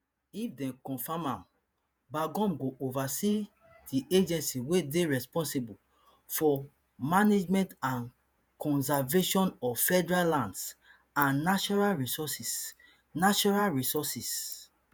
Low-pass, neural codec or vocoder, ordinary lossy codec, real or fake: none; vocoder, 48 kHz, 128 mel bands, Vocos; none; fake